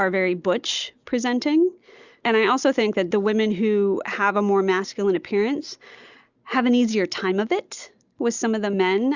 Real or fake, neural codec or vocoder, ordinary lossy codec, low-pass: real; none; Opus, 64 kbps; 7.2 kHz